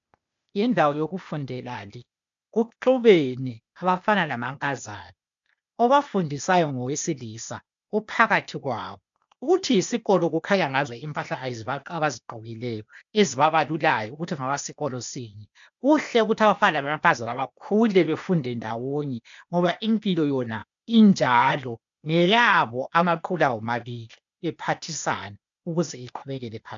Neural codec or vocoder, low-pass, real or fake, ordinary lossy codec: codec, 16 kHz, 0.8 kbps, ZipCodec; 7.2 kHz; fake; MP3, 64 kbps